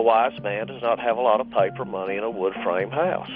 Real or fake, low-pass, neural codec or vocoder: real; 5.4 kHz; none